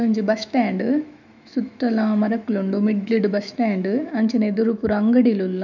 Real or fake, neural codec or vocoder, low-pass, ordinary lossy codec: fake; autoencoder, 48 kHz, 128 numbers a frame, DAC-VAE, trained on Japanese speech; 7.2 kHz; none